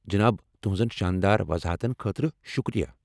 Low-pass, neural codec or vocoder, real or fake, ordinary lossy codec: none; none; real; none